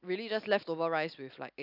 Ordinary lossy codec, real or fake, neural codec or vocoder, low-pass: none; real; none; 5.4 kHz